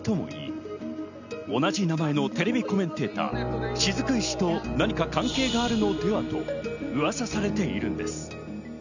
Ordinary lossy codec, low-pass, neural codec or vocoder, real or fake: none; 7.2 kHz; none; real